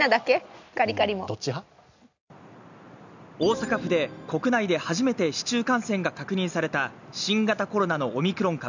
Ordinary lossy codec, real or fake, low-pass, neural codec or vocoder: none; real; 7.2 kHz; none